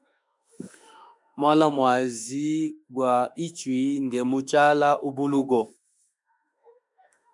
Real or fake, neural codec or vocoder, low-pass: fake; autoencoder, 48 kHz, 32 numbers a frame, DAC-VAE, trained on Japanese speech; 10.8 kHz